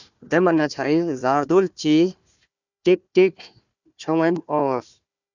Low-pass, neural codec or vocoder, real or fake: 7.2 kHz; codec, 16 kHz, 1 kbps, FunCodec, trained on Chinese and English, 50 frames a second; fake